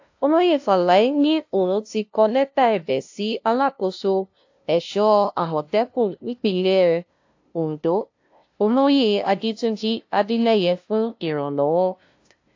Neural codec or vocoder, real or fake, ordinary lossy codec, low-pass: codec, 16 kHz, 0.5 kbps, FunCodec, trained on LibriTTS, 25 frames a second; fake; AAC, 48 kbps; 7.2 kHz